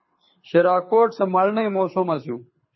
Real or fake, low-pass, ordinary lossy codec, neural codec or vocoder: fake; 7.2 kHz; MP3, 24 kbps; codec, 24 kHz, 6 kbps, HILCodec